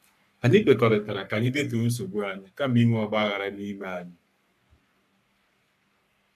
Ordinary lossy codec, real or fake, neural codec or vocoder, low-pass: MP3, 96 kbps; fake; codec, 44.1 kHz, 3.4 kbps, Pupu-Codec; 14.4 kHz